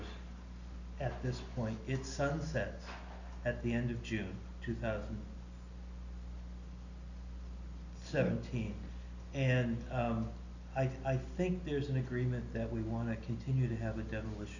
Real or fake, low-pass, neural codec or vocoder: real; 7.2 kHz; none